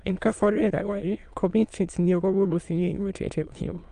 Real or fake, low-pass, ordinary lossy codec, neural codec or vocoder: fake; 9.9 kHz; Opus, 32 kbps; autoencoder, 22.05 kHz, a latent of 192 numbers a frame, VITS, trained on many speakers